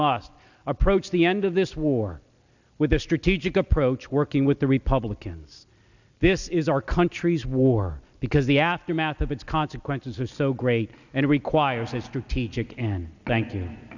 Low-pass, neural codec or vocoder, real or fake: 7.2 kHz; none; real